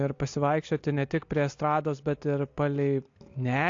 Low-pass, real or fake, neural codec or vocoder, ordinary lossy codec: 7.2 kHz; real; none; AAC, 48 kbps